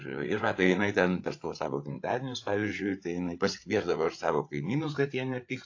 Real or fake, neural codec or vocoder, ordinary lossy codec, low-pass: fake; codec, 16 kHz, 8 kbps, FreqCodec, larger model; AAC, 48 kbps; 7.2 kHz